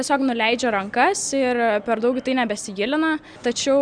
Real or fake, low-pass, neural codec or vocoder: real; 9.9 kHz; none